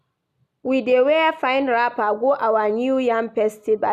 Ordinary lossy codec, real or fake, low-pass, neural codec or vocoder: none; real; 14.4 kHz; none